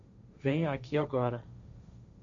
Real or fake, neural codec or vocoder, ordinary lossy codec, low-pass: fake; codec, 16 kHz, 1.1 kbps, Voila-Tokenizer; MP3, 48 kbps; 7.2 kHz